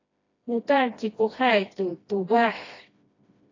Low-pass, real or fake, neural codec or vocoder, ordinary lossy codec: 7.2 kHz; fake; codec, 16 kHz, 1 kbps, FreqCodec, smaller model; AAC, 32 kbps